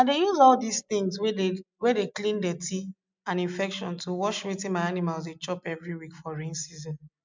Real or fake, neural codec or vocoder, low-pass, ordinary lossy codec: real; none; 7.2 kHz; MP3, 64 kbps